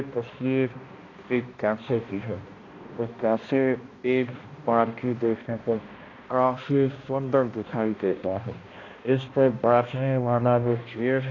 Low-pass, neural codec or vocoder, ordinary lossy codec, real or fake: 7.2 kHz; codec, 16 kHz, 1 kbps, X-Codec, HuBERT features, trained on balanced general audio; AAC, 32 kbps; fake